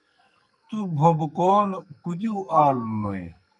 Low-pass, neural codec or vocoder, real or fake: 10.8 kHz; codec, 44.1 kHz, 2.6 kbps, SNAC; fake